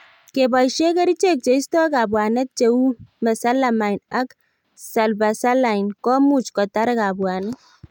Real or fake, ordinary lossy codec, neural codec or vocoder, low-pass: real; none; none; 19.8 kHz